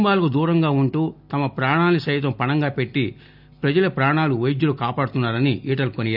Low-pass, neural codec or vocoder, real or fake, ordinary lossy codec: 5.4 kHz; none; real; none